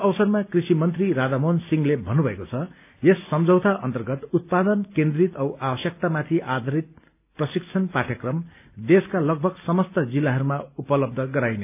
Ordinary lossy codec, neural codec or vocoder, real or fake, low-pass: AAC, 32 kbps; none; real; 3.6 kHz